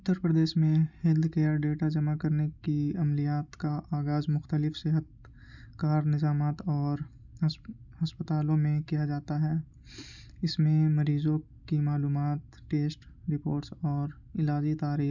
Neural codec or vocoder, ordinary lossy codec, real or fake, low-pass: none; none; real; 7.2 kHz